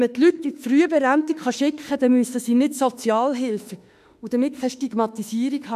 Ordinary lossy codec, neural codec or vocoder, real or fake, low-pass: none; autoencoder, 48 kHz, 32 numbers a frame, DAC-VAE, trained on Japanese speech; fake; 14.4 kHz